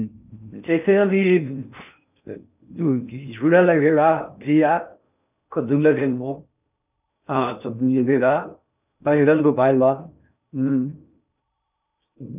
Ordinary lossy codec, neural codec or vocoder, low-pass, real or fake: none; codec, 16 kHz in and 24 kHz out, 0.6 kbps, FocalCodec, streaming, 4096 codes; 3.6 kHz; fake